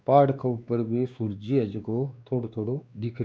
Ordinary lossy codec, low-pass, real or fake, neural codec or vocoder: none; none; fake; codec, 16 kHz, 2 kbps, X-Codec, WavLM features, trained on Multilingual LibriSpeech